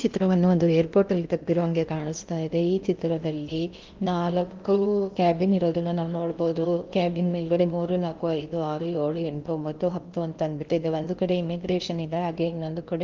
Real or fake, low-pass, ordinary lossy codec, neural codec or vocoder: fake; 7.2 kHz; Opus, 32 kbps; codec, 16 kHz in and 24 kHz out, 0.8 kbps, FocalCodec, streaming, 65536 codes